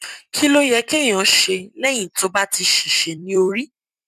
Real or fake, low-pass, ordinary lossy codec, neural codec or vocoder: fake; 14.4 kHz; none; vocoder, 44.1 kHz, 128 mel bands every 256 samples, BigVGAN v2